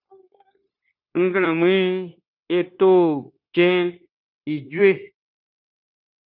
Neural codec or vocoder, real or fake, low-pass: codec, 16 kHz, 0.9 kbps, LongCat-Audio-Codec; fake; 5.4 kHz